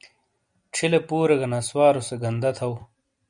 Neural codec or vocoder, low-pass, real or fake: none; 9.9 kHz; real